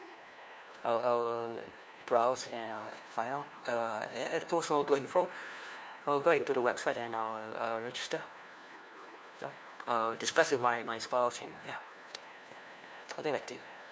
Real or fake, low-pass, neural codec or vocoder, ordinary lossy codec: fake; none; codec, 16 kHz, 1 kbps, FunCodec, trained on LibriTTS, 50 frames a second; none